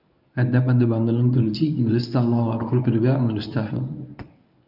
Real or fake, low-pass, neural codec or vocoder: fake; 5.4 kHz; codec, 24 kHz, 0.9 kbps, WavTokenizer, medium speech release version 1